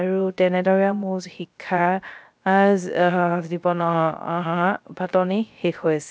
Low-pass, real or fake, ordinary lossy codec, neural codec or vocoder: none; fake; none; codec, 16 kHz, 0.3 kbps, FocalCodec